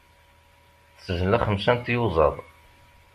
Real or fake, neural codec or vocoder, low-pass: real; none; 14.4 kHz